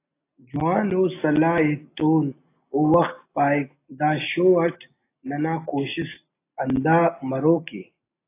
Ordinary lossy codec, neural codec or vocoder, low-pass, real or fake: AAC, 24 kbps; none; 3.6 kHz; real